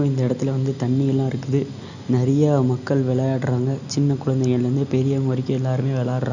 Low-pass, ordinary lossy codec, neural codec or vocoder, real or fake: 7.2 kHz; none; none; real